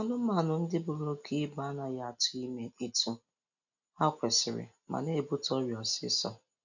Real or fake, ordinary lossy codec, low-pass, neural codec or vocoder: real; none; 7.2 kHz; none